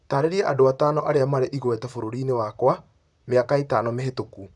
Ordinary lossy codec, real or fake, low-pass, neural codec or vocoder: none; fake; 10.8 kHz; vocoder, 44.1 kHz, 128 mel bands, Pupu-Vocoder